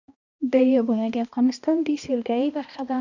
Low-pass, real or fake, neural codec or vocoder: 7.2 kHz; fake; codec, 16 kHz, 1 kbps, X-Codec, HuBERT features, trained on balanced general audio